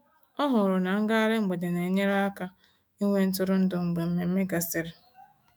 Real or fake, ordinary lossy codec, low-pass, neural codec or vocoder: fake; none; none; autoencoder, 48 kHz, 128 numbers a frame, DAC-VAE, trained on Japanese speech